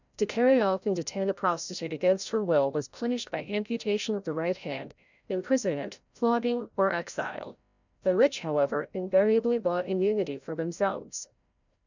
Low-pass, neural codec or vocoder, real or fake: 7.2 kHz; codec, 16 kHz, 0.5 kbps, FreqCodec, larger model; fake